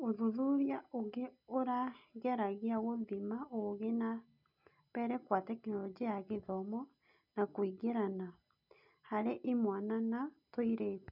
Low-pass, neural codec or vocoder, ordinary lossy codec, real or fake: 5.4 kHz; none; none; real